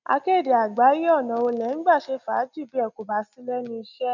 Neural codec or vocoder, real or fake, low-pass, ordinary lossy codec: none; real; 7.2 kHz; none